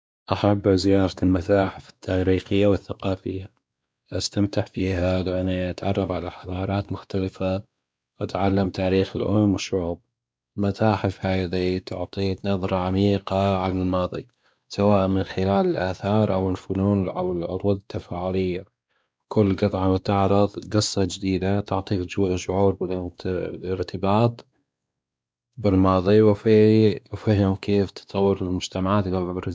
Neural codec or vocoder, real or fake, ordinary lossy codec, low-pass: codec, 16 kHz, 2 kbps, X-Codec, WavLM features, trained on Multilingual LibriSpeech; fake; none; none